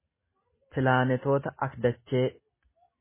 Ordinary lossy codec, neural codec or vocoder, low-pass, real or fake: MP3, 16 kbps; none; 3.6 kHz; real